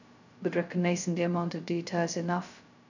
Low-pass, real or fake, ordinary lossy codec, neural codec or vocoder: 7.2 kHz; fake; none; codec, 16 kHz, 0.2 kbps, FocalCodec